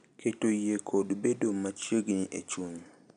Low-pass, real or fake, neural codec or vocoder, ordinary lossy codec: 9.9 kHz; real; none; none